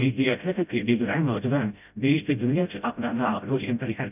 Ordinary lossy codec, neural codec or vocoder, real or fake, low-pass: none; codec, 16 kHz, 0.5 kbps, FreqCodec, smaller model; fake; 3.6 kHz